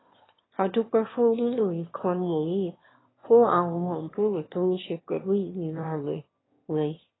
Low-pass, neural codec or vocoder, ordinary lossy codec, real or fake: 7.2 kHz; autoencoder, 22.05 kHz, a latent of 192 numbers a frame, VITS, trained on one speaker; AAC, 16 kbps; fake